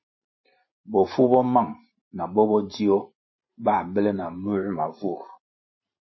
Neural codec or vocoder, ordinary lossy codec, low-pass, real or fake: vocoder, 44.1 kHz, 128 mel bands every 512 samples, BigVGAN v2; MP3, 24 kbps; 7.2 kHz; fake